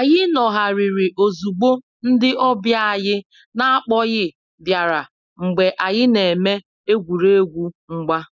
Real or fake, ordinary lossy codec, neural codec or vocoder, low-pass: real; none; none; 7.2 kHz